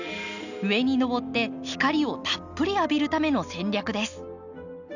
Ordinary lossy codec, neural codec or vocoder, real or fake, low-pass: none; none; real; 7.2 kHz